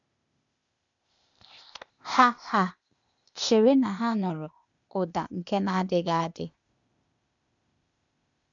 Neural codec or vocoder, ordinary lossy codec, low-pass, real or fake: codec, 16 kHz, 0.8 kbps, ZipCodec; none; 7.2 kHz; fake